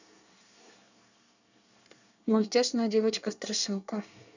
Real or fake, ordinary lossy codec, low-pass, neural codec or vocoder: fake; none; 7.2 kHz; codec, 24 kHz, 1 kbps, SNAC